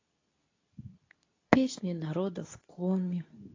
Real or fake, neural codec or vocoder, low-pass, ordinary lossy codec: fake; codec, 24 kHz, 0.9 kbps, WavTokenizer, medium speech release version 2; 7.2 kHz; AAC, 32 kbps